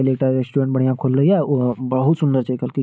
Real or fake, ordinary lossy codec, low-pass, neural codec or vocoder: real; none; none; none